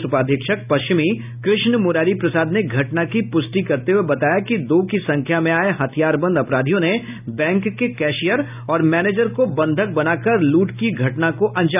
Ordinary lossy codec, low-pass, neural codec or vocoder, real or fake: none; 3.6 kHz; none; real